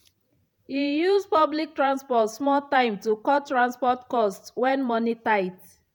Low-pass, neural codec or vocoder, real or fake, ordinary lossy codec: none; vocoder, 48 kHz, 128 mel bands, Vocos; fake; none